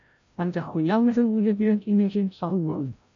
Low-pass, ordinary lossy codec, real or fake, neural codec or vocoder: 7.2 kHz; MP3, 96 kbps; fake; codec, 16 kHz, 0.5 kbps, FreqCodec, larger model